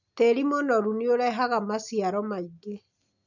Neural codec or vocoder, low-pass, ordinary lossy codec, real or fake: none; 7.2 kHz; none; real